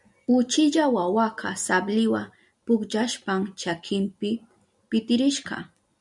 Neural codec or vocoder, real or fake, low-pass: none; real; 10.8 kHz